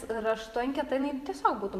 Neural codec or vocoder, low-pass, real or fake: vocoder, 44.1 kHz, 128 mel bands every 512 samples, BigVGAN v2; 14.4 kHz; fake